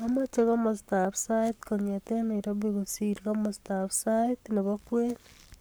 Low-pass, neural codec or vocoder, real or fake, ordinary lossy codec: none; codec, 44.1 kHz, 7.8 kbps, Pupu-Codec; fake; none